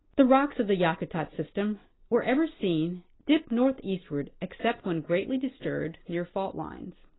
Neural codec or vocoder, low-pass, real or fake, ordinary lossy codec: none; 7.2 kHz; real; AAC, 16 kbps